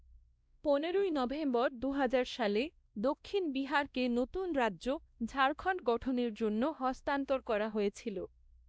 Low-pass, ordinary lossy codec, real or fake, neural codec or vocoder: none; none; fake; codec, 16 kHz, 1 kbps, X-Codec, WavLM features, trained on Multilingual LibriSpeech